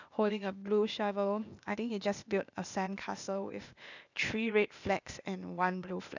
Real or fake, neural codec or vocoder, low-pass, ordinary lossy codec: fake; codec, 16 kHz, 0.8 kbps, ZipCodec; 7.2 kHz; none